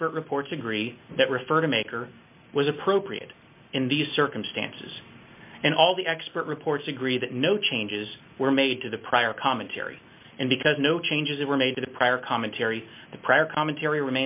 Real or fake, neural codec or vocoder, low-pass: real; none; 3.6 kHz